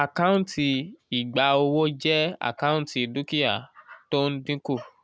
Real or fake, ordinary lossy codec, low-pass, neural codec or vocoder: real; none; none; none